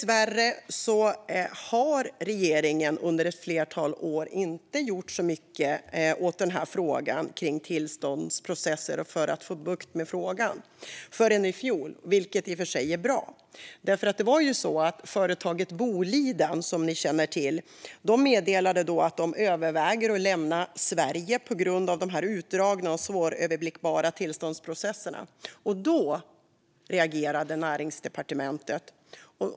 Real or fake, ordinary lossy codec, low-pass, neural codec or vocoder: real; none; none; none